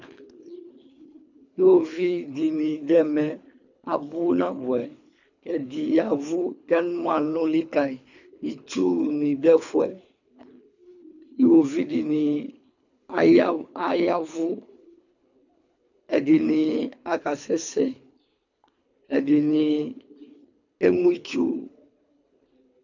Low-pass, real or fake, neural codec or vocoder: 7.2 kHz; fake; codec, 24 kHz, 3 kbps, HILCodec